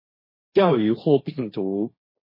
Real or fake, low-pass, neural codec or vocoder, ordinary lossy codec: fake; 5.4 kHz; codec, 16 kHz, 1.1 kbps, Voila-Tokenizer; MP3, 24 kbps